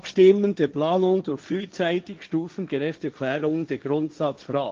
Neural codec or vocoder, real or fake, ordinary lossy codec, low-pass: codec, 16 kHz, 1.1 kbps, Voila-Tokenizer; fake; Opus, 24 kbps; 7.2 kHz